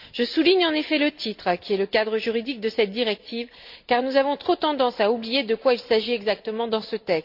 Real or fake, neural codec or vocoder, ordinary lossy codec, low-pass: real; none; AAC, 48 kbps; 5.4 kHz